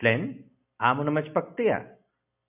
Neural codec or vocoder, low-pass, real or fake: none; 3.6 kHz; real